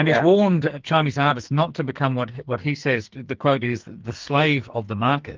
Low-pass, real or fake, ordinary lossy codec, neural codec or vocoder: 7.2 kHz; fake; Opus, 32 kbps; codec, 44.1 kHz, 2.6 kbps, SNAC